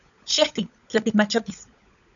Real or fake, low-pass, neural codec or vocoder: fake; 7.2 kHz; codec, 16 kHz, 16 kbps, FunCodec, trained on LibriTTS, 50 frames a second